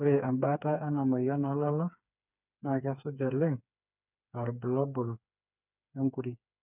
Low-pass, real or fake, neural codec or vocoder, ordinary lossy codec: 3.6 kHz; fake; codec, 16 kHz, 4 kbps, FreqCodec, smaller model; none